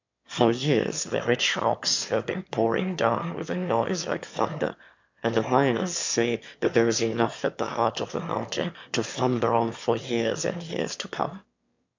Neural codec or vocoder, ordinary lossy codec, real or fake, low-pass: autoencoder, 22.05 kHz, a latent of 192 numbers a frame, VITS, trained on one speaker; MP3, 64 kbps; fake; 7.2 kHz